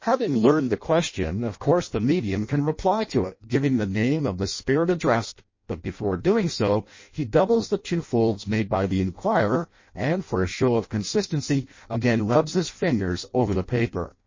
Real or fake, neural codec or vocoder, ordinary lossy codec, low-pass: fake; codec, 16 kHz in and 24 kHz out, 0.6 kbps, FireRedTTS-2 codec; MP3, 32 kbps; 7.2 kHz